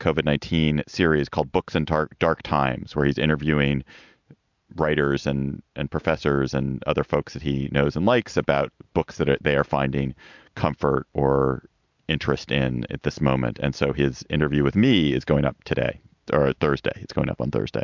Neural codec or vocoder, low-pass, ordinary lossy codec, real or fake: none; 7.2 kHz; MP3, 64 kbps; real